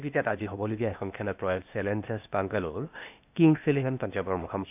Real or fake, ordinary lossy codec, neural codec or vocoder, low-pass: fake; none; codec, 16 kHz, 0.8 kbps, ZipCodec; 3.6 kHz